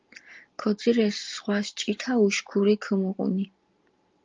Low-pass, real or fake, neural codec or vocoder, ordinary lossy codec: 7.2 kHz; real; none; Opus, 32 kbps